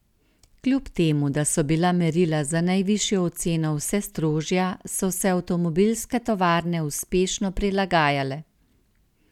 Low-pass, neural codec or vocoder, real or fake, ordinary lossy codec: 19.8 kHz; none; real; none